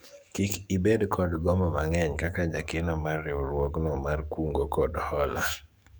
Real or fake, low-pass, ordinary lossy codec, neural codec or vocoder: fake; none; none; codec, 44.1 kHz, 7.8 kbps, DAC